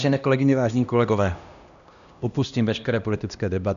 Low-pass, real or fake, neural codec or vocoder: 7.2 kHz; fake; codec, 16 kHz, 1 kbps, X-Codec, HuBERT features, trained on LibriSpeech